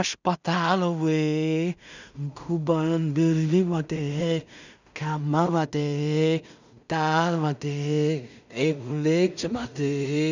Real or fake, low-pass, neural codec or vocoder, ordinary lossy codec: fake; 7.2 kHz; codec, 16 kHz in and 24 kHz out, 0.4 kbps, LongCat-Audio-Codec, two codebook decoder; none